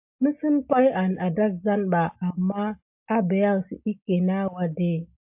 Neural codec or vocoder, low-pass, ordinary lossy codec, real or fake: none; 3.6 kHz; MP3, 32 kbps; real